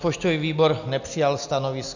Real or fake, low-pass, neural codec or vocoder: real; 7.2 kHz; none